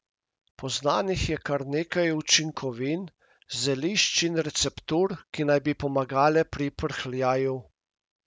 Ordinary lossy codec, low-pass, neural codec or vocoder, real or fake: none; none; none; real